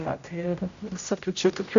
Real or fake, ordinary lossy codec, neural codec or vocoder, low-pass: fake; Opus, 64 kbps; codec, 16 kHz, 0.5 kbps, X-Codec, HuBERT features, trained on general audio; 7.2 kHz